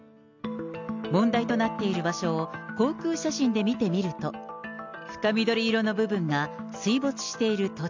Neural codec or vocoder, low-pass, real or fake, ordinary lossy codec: none; 7.2 kHz; real; MP3, 64 kbps